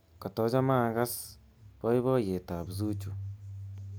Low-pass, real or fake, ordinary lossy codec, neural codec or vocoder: none; real; none; none